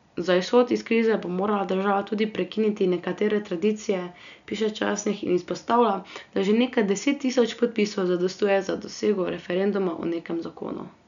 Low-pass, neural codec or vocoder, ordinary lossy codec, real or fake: 7.2 kHz; none; none; real